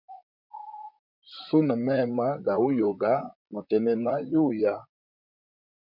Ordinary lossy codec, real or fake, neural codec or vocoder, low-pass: MP3, 48 kbps; fake; vocoder, 44.1 kHz, 128 mel bands, Pupu-Vocoder; 5.4 kHz